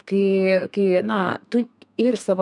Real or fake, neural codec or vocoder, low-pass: fake; codec, 32 kHz, 1.9 kbps, SNAC; 10.8 kHz